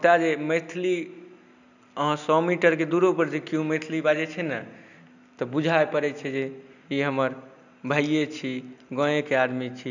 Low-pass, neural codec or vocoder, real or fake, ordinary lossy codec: 7.2 kHz; none; real; none